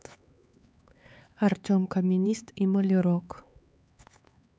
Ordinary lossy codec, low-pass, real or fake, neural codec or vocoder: none; none; fake; codec, 16 kHz, 4 kbps, X-Codec, HuBERT features, trained on LibriSpeech